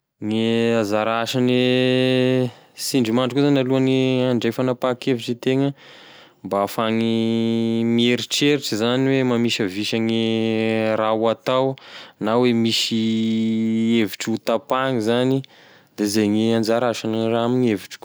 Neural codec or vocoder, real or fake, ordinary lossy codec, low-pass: none; real; none; none